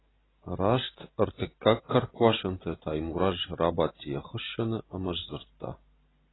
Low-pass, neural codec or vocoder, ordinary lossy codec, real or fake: 7.2 kHz; none; AAC, 16 kbps; real